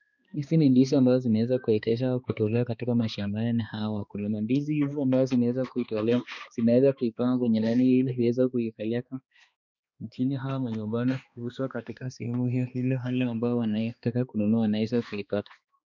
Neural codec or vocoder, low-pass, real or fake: codec, 16 kHz, 2 kbps, X-Codec, HuBERT features, trained on balanced general audio; 7.2 kHz; fake